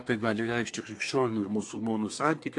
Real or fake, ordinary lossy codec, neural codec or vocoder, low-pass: fake; AAC, 48 kbps; codec, 24 kHz, 1 kbps, SNAC; 10.8 kHz